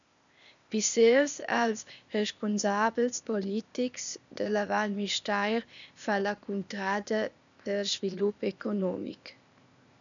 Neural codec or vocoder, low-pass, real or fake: codec, 16 kHz, 0.8 kbps, ZipCodec; 7.2 kHz; fake